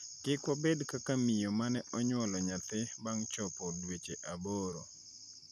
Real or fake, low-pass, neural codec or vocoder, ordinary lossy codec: real; 14.4 kHz; none; none